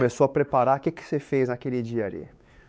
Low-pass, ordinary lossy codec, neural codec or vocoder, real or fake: none; none; codec, 16 kHz, 4 kbps, X-Codec, WavLM features, trained on Multilingual LibriSpeech; fake